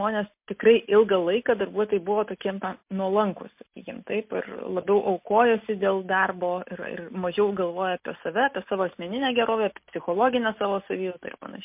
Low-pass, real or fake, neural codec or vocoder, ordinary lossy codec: 3.6 kHz; real; none; MP3, 32 kbps